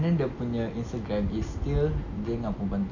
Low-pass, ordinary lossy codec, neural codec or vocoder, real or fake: 7.2 kHz; none; none; real